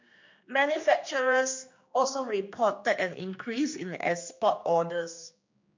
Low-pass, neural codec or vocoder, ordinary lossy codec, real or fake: 7.2 kHz; codec, 16 kHz, 2 kbps, X-Codec, HuBERT features, trained on general audio; MP3, 48 kbps; fake